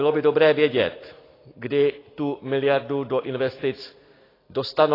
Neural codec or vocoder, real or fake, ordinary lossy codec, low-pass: none; real; AAC, 24 kbps; 5.4 kHz